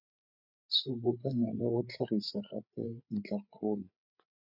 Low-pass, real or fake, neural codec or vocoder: 5.4 kHz; fake; codec, 16 kHz, 8 kbps, FreqCodec, larger model